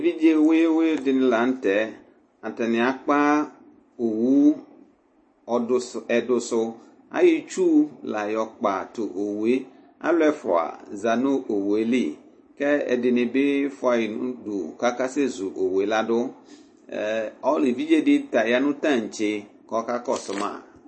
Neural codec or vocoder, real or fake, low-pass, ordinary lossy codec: none; real; 9.9 kHz; MP3, 32 kbps